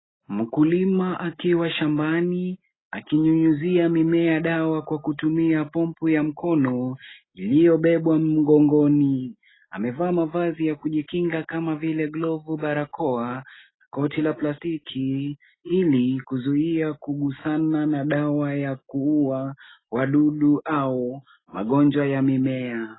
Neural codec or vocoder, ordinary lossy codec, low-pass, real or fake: none; AAC, 16 kbps; 7.2 kHz; real